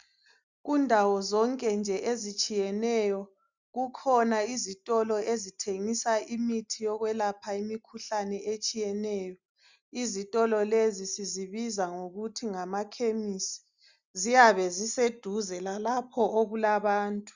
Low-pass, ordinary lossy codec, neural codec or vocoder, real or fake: 7.2 kHz; Opus, 64 kbps; none; real